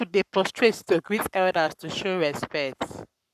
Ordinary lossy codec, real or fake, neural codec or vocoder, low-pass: none; fake; codec, 44.1 kHz, 7.8 kbps, Pupu-Codec; 14.4 kHz